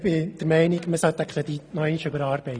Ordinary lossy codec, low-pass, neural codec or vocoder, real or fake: none; none; none; real